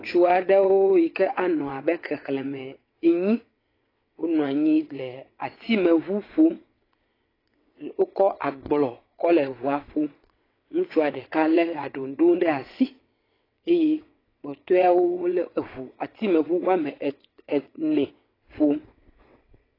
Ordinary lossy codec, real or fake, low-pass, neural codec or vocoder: AAC, 24 kbps; fake; 5.4 kHz; vocoder, 22.05 kHz, 80 mel bands, WaveNeXt